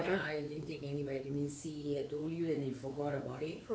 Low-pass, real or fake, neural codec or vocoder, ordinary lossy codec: none; fake; codec, 16 kHz, 4 kbps, X-Codec, WavLM features, trained on Multilingual LibriSpeech; none